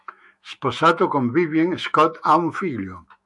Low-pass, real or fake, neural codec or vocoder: 10.8 kHz; fake; autoencoder, 48 kHz, 128 numbers a frame, DAC-VAE, trained on Japanese speech